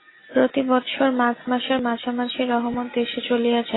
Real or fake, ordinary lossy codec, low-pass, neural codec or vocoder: real; AAC, 16 kbps; 7.2 kHz; none